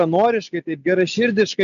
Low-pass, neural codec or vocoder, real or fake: 7.2 kHz; none; real